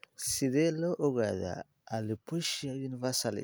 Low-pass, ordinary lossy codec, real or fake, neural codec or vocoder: none; none; real; none